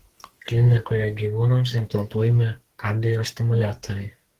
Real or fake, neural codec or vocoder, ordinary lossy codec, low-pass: fake; codec, 32 kHz, 1.9 kbps, SNAC; Opus, 16 kbps; 14.4 kHz